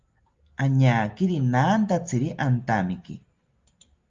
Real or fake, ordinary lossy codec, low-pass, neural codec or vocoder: real; Opus, 32 kbps; 7.2 kHz; none